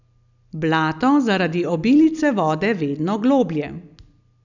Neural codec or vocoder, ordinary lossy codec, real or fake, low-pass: none; none; real; 7.2 kHz